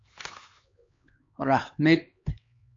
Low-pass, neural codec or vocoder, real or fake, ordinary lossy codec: 7.2 kHz; codec, 16 kHz, 2 kbps, X-Codec, HuBERT features, trained on LibriSpeech; fake; MP3, 48 kbps